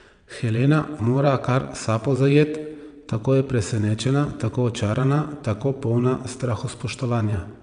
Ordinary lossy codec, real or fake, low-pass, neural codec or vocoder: none; fake; 9.9 kHz; vocoder, 22.05 kHz, 80 mel bands, WaveNeXt